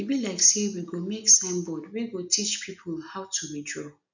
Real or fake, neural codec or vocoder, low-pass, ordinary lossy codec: real; none; 7.2 kHz; none